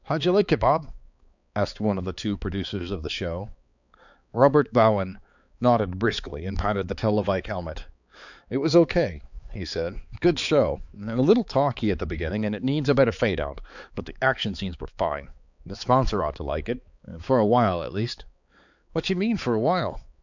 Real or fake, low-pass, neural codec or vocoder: fake; 7.2 kHz; codec, 16 kHz, 4 kbps, X-Codec, HuBERT features, trained on balanced general audio